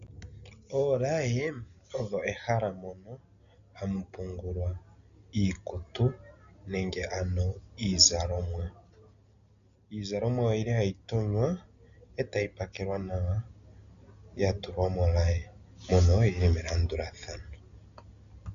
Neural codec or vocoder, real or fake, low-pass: none; real; 7.2 kHz